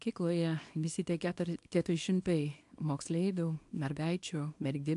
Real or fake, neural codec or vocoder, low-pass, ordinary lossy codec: fake; codec, 24 kHz, 0.9 kbps, WavTokenizer, small release; 10.8 kHz; AAC, 64 kbps